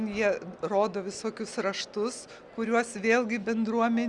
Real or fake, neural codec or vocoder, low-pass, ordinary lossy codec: real; none; 10.8 kHz; Opus, 64 kbps